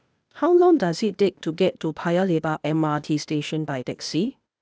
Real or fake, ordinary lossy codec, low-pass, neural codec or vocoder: fake; none; none; codec, 16 kHz, 0.8 kbps, ZipCodec